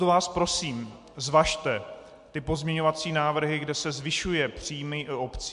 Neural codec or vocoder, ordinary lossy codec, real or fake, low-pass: none; MP3, 64 kbps; real; 10.8 kHz